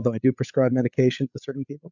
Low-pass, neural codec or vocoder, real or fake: 7.2 kHz; codec, 16 kHz, 8 kbps, FreqCodec, larger model; fake